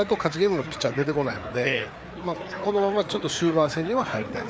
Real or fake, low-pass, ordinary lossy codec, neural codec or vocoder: fake; none; none; codec, 16 kHz, 4 kbps, FreqCodec, larger model